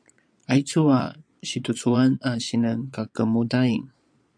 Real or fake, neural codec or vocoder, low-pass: fake; vocoder, 22.05 kHz, 80 mel bands, Vocos; 9.9 kHz